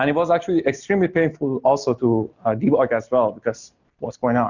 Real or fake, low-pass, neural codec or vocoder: real; 7.2 kHz; none